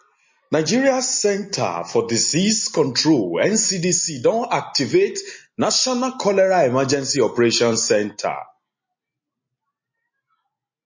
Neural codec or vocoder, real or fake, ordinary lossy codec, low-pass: none; real; MP3, 32 kbps; 7.2 kHz